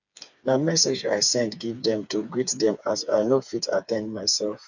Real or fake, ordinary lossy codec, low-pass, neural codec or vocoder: fake; none; 7.2 kHz; codec, 16 kHz, 4 kbps, FreqCodec, smaller model